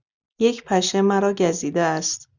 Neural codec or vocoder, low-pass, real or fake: none; 7.2 kHz; real